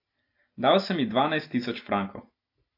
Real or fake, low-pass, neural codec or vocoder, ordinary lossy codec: real; 5.4 kHz; none; AAC, 32 kbps